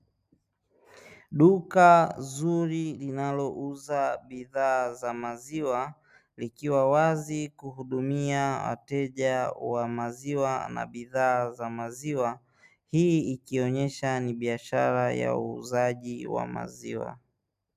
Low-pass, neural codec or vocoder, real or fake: 14.4 kHz; none; real